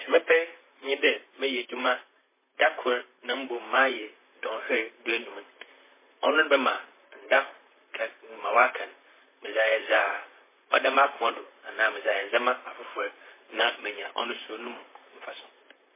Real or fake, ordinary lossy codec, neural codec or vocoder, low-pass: fake; MP3, 16 kbps; vocoder, 44.1 kHz, 128 mel bands, Pupu-Vocoder; 3.6 kHz